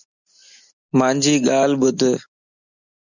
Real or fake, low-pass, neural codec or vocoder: real; 7.2 kHz; none